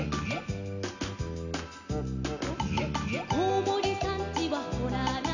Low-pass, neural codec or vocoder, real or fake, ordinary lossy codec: 7.2 kHz; none; real; none